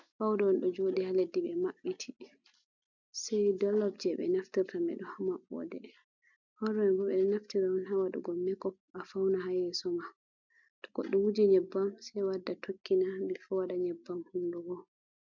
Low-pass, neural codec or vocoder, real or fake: 7.2 kHz; none; real